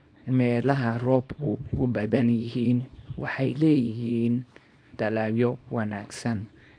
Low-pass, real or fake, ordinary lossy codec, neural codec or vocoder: 9.9 kHz; fake; AAC, 48 kbps; codec, 24 kHz, 0.9 kbps, WavTokenizer, small release